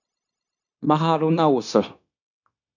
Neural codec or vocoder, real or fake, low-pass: codec, 16 kHz, 0.9 kbps, LongCat-Audio-Codec; fake; 7.2 kHz